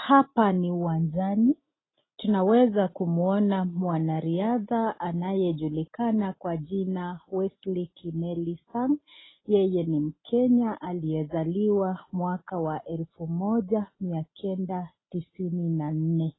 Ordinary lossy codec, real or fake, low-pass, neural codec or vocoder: AAC, 16 kbps; real; 7.2 kHz; none